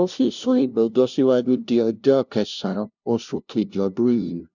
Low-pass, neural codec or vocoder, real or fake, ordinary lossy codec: 7.2 kHz; codec, 16 kHz, 0.5 kbps, FunCodec, trained on LibriTTS, 25 frames a second; fake; none